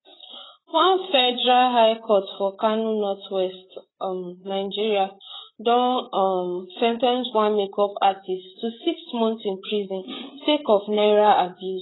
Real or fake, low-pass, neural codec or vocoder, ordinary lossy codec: fake; 7.2 kHz; codec, 16 kHz, 8 kbps, FreqCodec, larger model; AAC, 16 kbps